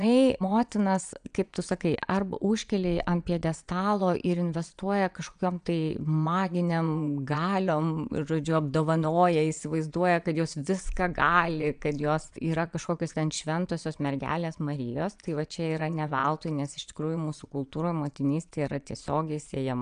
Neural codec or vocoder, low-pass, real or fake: vocoder, 22.05 kHz, 80 mel bands, Vocos; 9.9 kHz; fake